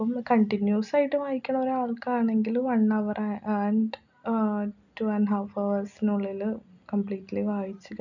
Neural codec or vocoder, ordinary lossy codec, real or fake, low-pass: none; none; real; 7.2 kHz